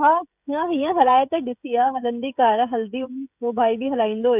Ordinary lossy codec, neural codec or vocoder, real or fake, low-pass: none; codec, 16 kHz, 16 kbps, FreqCodec, smaller model; fake; 3.6 kHz